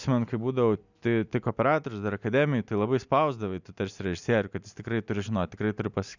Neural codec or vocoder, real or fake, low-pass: none; real; 7.2 kHz